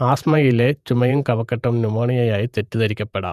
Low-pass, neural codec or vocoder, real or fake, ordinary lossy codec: 14.4 kHz; vocoder, 48 kHz, 128 mel bands, Vocos; fake; none